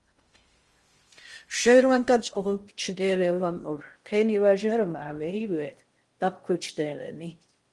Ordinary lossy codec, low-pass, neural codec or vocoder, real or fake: Opus, 24 kbps; 10.8 kHz; codec, 16 kHz in and 24 kHz out, 0.6 kbps, FocalCodec, streaming, 2048 codes; fake